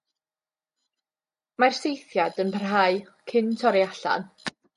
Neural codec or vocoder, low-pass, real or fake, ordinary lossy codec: none; 14.4 kHz; real; MP3, 48 kbps